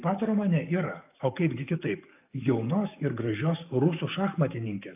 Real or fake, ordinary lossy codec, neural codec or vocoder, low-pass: fake; AAC, 32 kbps; codec, 44.1 kHz, 7.8 kbps, DAC; 3.6 kHz